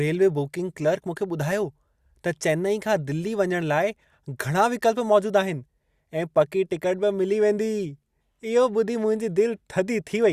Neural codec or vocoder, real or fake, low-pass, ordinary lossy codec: none; real; 14.4 kHz; Opus, 64 kbps